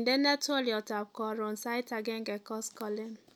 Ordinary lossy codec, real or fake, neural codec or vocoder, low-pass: none; real; none; none